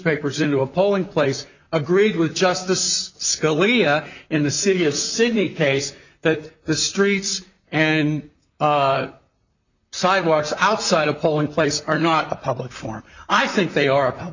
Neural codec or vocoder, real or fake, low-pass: vocoder, 44.1 kHz, 128 mel bands, Pupu-Vocoder; fake; 7.2 kHz